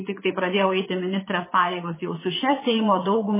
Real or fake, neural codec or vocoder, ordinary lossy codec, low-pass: fake; vocoder, 22.05 kHz, 80 mel bands, WaveNeXt; MP3, 16 kbps; 3.6 kHz